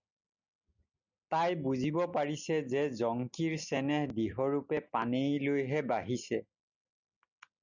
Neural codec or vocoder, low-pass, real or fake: none; 7.2 kHz; real